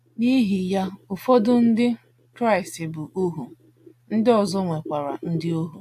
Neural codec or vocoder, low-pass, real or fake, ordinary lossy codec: none; 14.4 kHz; real; AAC, 64 kbps